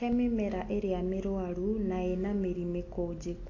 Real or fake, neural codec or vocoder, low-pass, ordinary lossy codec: real; none; 7.2 kHz; AAC, 32 kbps